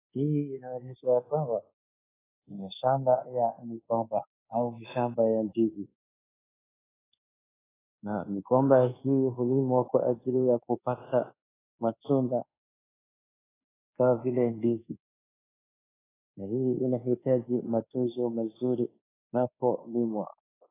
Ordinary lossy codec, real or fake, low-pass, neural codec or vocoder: AAC, 16 kbps; fake; 3.6 kHz; codec, 24 kHz, 1.2 kbps, DualCodec